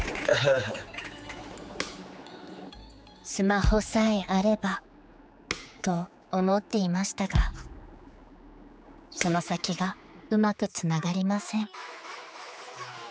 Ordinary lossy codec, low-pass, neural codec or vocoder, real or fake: none; none; codec, 16 kHz, 4 kbps, X-Codec, HuBERT features, trained on general audio; fake